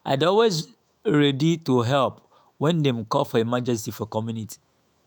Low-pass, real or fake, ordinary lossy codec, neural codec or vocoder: none; fake; none; autoencoder, 48 kHz, 128 numbers a frame, DAC-VAE, trained on Japanese speech